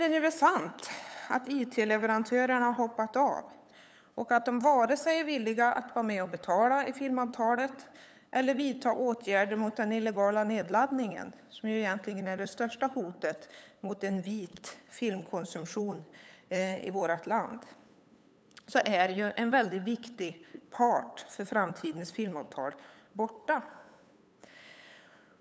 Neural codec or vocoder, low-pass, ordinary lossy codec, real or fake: codec, 16 kHz, 8 kbps, FunCodec, trained on LibriTTS, 25 frames a second; none; none; fake